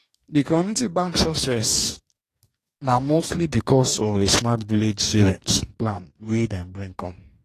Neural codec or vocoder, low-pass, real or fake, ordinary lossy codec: codec, 44.1 kHz, 2.6 kbps, DAC; 14.4 kHz; fake; AAC, 48 kbps